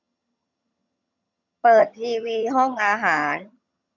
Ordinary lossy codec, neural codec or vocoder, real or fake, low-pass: none; vocoder, 22.05 kHz, 80 mel bands, HiFi-GAN; fake; 7.2 kHz